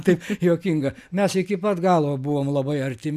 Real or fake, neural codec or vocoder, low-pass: real; none; 14.4 kHz